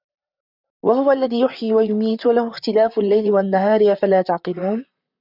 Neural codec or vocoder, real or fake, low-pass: vocoder, 44.1 kHz, 128 mel bands, Pupu-Vocoder; fake; 5.4 kHz